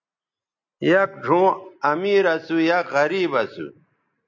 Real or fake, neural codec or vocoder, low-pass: real; none; 7.2 kHz